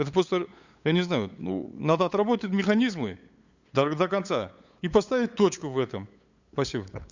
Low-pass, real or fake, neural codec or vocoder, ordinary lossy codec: 7.2 kHz; fake; codec, 16 kHz, 8 kbps, FunCodec, trained on LibriTTS, 25 frames a second; Opus, 64 kbps